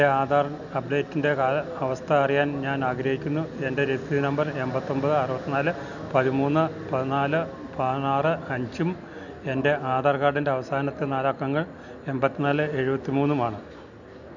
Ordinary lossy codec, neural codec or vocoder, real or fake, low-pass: none; none; real; 7.2 kHz